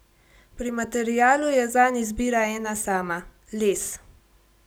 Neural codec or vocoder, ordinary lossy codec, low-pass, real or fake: none; none; none; real